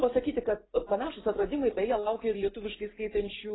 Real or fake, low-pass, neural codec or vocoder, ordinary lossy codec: real; 7.2 kHz; none; AAC, 16 kbps